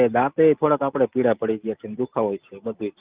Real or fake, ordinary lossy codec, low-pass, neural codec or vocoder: real; Opus, 24 kbps; 3.6 kHz; none